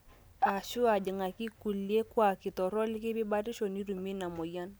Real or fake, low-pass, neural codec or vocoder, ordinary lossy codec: real; none; none; none